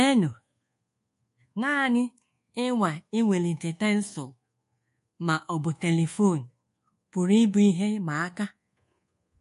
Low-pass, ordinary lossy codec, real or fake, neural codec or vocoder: 10.8 kHz; MP3, 48 kbps; fake; codec, 24 kHz, 1.2 kbps, DualCodec